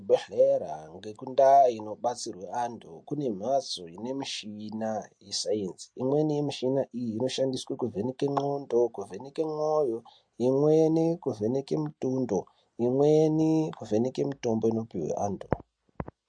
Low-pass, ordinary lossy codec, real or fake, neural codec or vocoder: 9.9 kHz; MP3, 48 kbps; real; none